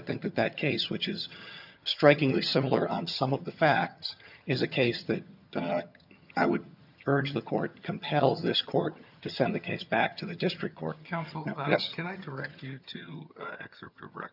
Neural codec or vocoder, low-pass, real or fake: vocoder, 22.05 kHz, 80 mel bands, HiFi-GAN; 5.4 kHz; fake